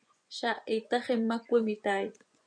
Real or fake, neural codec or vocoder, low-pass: fake; vocoder, 24 kHz, 100 mel bands, Vocos; 9.9 kHz